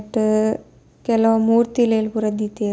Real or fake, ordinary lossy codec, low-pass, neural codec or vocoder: real; none; none; none